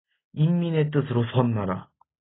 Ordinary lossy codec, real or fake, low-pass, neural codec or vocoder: AAC, 16 kbps; real; 7.2 kHz; none